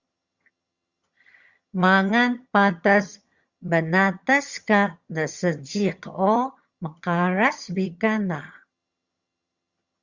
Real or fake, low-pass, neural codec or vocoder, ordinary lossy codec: fake; 7.2 kHz; vocoder, 22.05 kHz, 80 mel bands, HiFi-GAN; Opus, 64 kbps